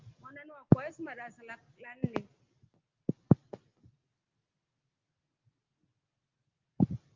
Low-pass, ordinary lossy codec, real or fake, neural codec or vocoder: 7.2 kHz; Opus, 24 kbps; real; none